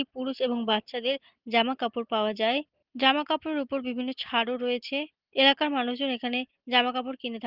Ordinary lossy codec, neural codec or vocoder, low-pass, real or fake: Opus, 16 kbps; none; 5.4 kHz; real